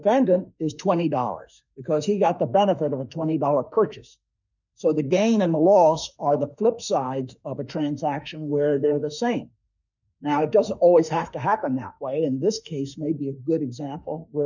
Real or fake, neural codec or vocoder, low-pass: fake; autoencoder, 48 kHz, 32 numbers a frame, DAC-VAE, trained on Japanese speech; 7.2 kHz